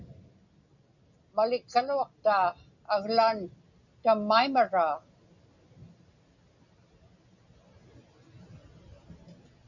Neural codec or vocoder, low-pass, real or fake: none; 7.2 kHz; real